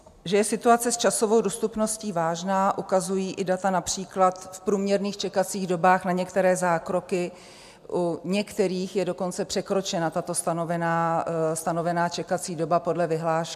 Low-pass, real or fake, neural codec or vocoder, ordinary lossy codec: 14.4 kHz; real; none; MP3, 96 kbps